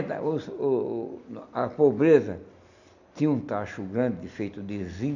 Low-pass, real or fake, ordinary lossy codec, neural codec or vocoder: 7.2 kHz; real; none; none